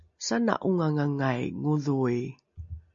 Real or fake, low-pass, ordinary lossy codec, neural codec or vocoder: real; 7.2 kHz; MP3, 48 kbps; none